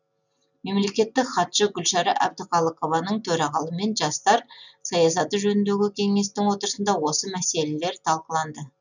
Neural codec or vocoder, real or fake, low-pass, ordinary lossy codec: none; real; 7.2 kHz; none